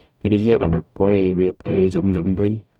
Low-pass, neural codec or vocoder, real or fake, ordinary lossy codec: 19.8 kHz; codec, 44.1 kHz, 0.9 kbps, DAC; fake; none